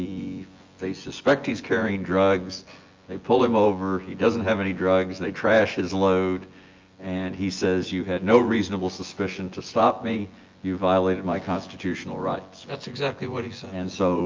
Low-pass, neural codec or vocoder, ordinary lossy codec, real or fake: 7.2 kHz; vocoder, 24 kHz, 100 mel bands, Vocos; Opus, 32 kbps; fake